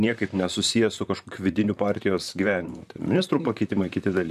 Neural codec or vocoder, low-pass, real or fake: vocoder, 44.1 kHz, 128 mel bands, Pupu-Vocoder; 14.4 kHz; fake